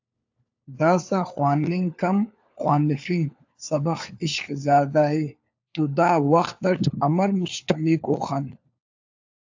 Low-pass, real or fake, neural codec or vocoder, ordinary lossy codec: 7.2 kHz; fake; codec, 16 kHz, 4 kbps, FunCodec, trained on LibriTTS, 50 frames a second; AAC, 48 kbps